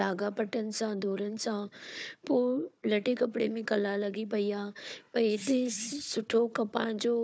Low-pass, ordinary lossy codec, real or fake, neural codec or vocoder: none; none; fake; codec, 16 kHz, 4 kbps, FunCodec, trained on Chinese and English, 50 frames a second